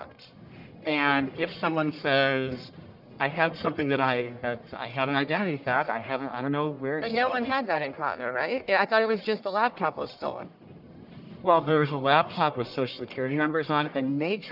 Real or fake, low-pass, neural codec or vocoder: fake; 5.4 kHz; codec, 44.1 kHz, 1.7 kbps, Pupu-Codec